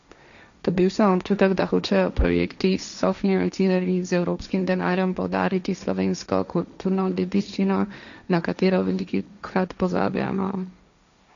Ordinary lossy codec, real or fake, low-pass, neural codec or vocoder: none; fake; 7.2 kHz; codec, 16 kHz, 1.1 kbps, Voila-Tokenizer